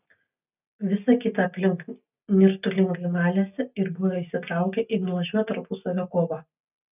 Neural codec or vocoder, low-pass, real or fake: none; 3.6 kHz; real